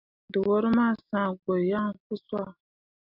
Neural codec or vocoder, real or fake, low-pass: none; real; 5.4 kHz